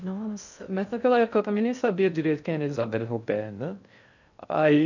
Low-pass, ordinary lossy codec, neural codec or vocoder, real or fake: 7.2 kHz; none; codec, 16 kHz in and 24 kHz out, 0.6 kbps, FocalCodec, streaming, 2048 codes; fake